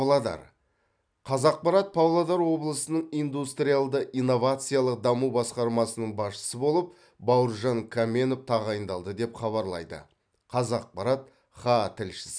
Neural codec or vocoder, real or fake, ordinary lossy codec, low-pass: none; real; none; 9.9 kHz